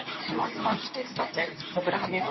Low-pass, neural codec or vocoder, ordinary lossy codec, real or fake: 7.2 kHz; codec, 24 kHz, 0.9 kbps, WavTokenizer, medium speech release version 2; MP3, 24 kbps; fake